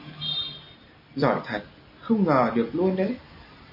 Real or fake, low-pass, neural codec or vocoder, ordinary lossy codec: real; 5.4 kHz; none; MP3, 32 kbps